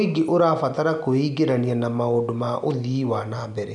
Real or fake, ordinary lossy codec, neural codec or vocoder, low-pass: real; none; none; 10.8 kHz